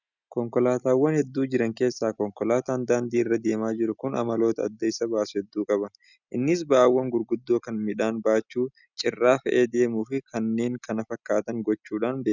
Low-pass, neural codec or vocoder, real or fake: 7.2 kHz; none; real